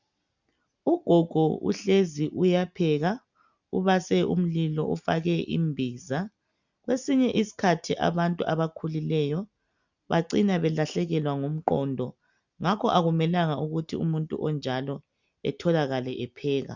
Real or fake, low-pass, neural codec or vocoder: real; 7.2 kHz; none